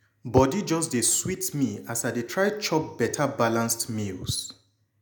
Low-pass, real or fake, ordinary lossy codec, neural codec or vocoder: none; real; none; none